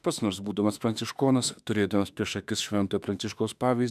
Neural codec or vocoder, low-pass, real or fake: autoencoder, 48 kHz, 32 numbers a frame, DAC-VAE, trained on Japanese speech; 14.4 kHz; fake